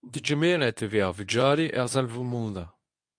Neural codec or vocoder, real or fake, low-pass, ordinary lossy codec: codec, 24 kHz, 0.9 kbps, WavTokenizer, medium speech release version 1; fake; 9.9 kHz; AAC, 64 kbps